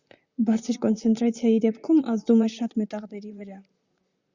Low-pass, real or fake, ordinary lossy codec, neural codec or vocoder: 7.2 kHz; fake; Opus, 64 kbps; vocoder, 44.1 kHz, 128 mel bands, Pupu-Vocoder